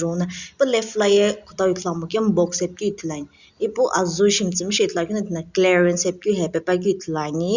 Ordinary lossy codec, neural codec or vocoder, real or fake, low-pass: Opus, 64 kbps; none; real; 7.2 kHz